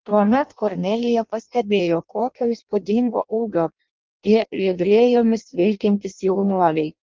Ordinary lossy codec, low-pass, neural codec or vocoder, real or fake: Opus, 24 kbps; 7.2 kHz; codec, 16 kHz in and 24 kHz out, 0.6 kbps, FireRedTTS-2 codec; fake